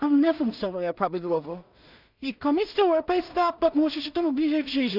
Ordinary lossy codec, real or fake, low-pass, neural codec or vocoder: none; fake; 5.4 kHz; codec, 16 kHz in and 24 kHz out, 0.4 kbps, LongCat-Audio-Codec, two codebook decoder